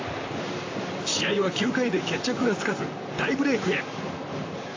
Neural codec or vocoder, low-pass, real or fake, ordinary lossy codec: vocoder, 44.1 kHz, 128 mel bands, Pupu-Vocoder; 7.2 kHz; fake; AAC, 32 kbps